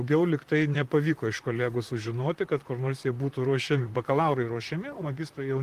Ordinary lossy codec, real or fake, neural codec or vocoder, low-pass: Opus, 24 kbps; fake; vocoder, 44.1 kHz, 128 mel bands, Pupu-Vocoder; 14.4 kHz